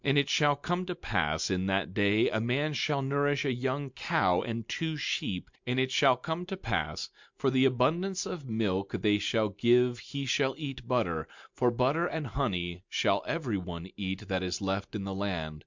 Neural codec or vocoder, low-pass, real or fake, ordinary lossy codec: none; 7.2 kHz; real; MP3, 64 kbps